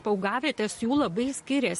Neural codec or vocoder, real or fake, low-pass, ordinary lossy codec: none; real; 14.4 kHz; MP3, 48 kbps